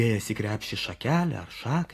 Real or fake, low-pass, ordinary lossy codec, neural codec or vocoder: real; 14.4 kHz; AAC, 48 kbps; none